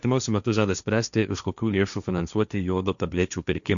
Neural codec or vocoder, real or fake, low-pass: codec, 16 kHz, 1.1 kbps, Voila-Tokenizer; fake; 7.2 kHz